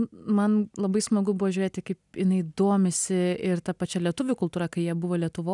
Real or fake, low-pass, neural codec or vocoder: real; 10.8 kHz; none